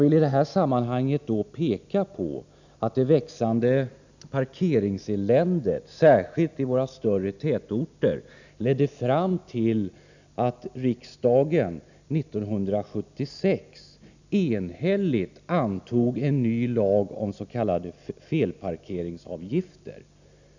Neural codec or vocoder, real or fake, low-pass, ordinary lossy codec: none; real; 7.2 kHz; none